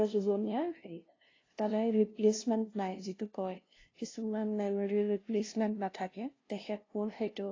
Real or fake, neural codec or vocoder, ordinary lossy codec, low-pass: fake; codec, 16 kHz, 0.5 kbps, FunCodec, trained on LibriTTS, 25 frames a second; AAC, 32 kbps; 7.2 kHz